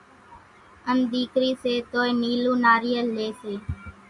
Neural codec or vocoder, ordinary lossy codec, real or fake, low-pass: none; Opus, 64 kbps; real; 10.8 kHz